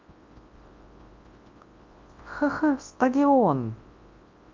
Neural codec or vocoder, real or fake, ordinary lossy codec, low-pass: codec, 24 kHz, 0.9 kbps, WavTokenizer, large speech release; fake; Opus, 32 kbps; 7.2 kHz